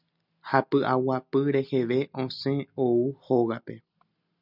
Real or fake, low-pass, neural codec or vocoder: real; 5.4 kHz; none